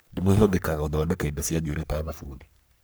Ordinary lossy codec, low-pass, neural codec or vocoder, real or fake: none; none; codec, 44.1 kHz, 3.4 kbps, Pupu-Codec; fake